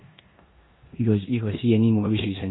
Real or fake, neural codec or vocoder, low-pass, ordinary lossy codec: fake; codec, 16 kHz in and 24 kHz out, 0.9 kbps, LongCat-Audio-Codec, four codebook decoder; 7.2 kHz; AAC, 16 kbps